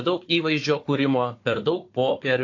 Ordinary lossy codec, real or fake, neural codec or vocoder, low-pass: AAC, 48 kbps; fake; codec, 16 kHz, 4 kbps, FunCodec, trained on Chinese and English, 50 frames a second; 7.2 kHz